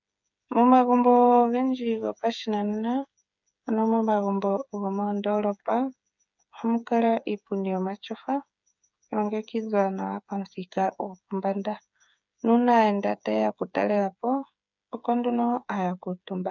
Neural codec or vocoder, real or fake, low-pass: codec, 16 kHz, 8 kbps, FreqCodec, smaller model; fake; 7.2 kHz